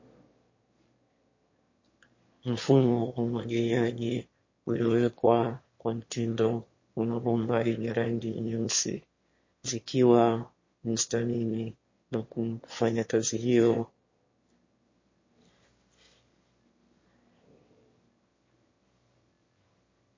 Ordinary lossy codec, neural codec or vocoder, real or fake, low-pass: MP3, 32 kbps; autoencoder, 22.05 kHz, a latent of 192 numbers a frame, VITS, trained on one speaker; fake; 7.2 kHz